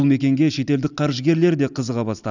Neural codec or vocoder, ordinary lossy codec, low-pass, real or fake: none; none; 7.2 kHz; real